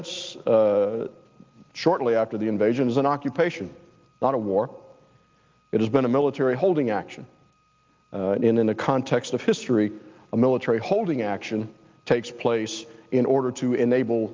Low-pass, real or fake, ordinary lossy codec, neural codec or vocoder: 7.2 kHz; real; Opus, 24 kbps; none